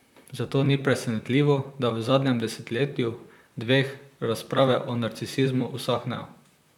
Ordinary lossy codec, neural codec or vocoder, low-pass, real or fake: none; vocoder, 44.1 kHz, 128 mel bands, Pupu-Vocoder; 19.8 kHz; fake